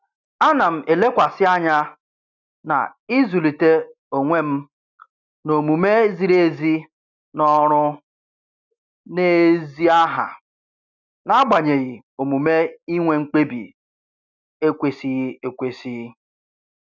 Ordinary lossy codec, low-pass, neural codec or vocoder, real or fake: none; 7.2 kHz; none; real